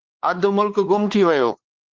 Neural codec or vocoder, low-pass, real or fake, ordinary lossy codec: codec, 16 kHz, 4 kbps, X-Codec, WavLM features, trained on Multilingual LibriSpeech; 7.2 kHz; fake; Opus, 16 kbps